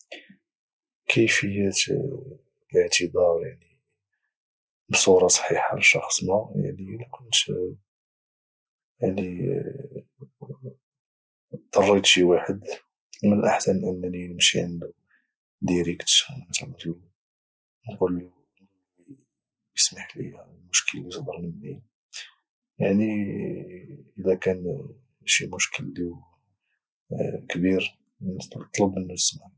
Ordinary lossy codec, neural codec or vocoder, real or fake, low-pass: none; none; real; none